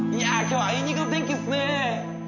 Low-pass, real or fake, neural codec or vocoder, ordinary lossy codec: 7.2 kHz; real; none; none